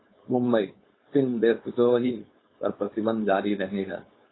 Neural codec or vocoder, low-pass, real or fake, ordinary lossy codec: codec, 16 kHz, 4.8 kbps, FACodec; 7.2 kHz; fake; AAC, 16 kbps